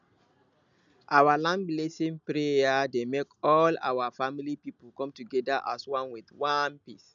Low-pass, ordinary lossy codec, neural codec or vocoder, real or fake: 7.2 kHz; none; none; real